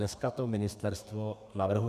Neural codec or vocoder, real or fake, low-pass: codec, 32 kHz, 1.9 kbps, SNAC; fake; 14.4 kHz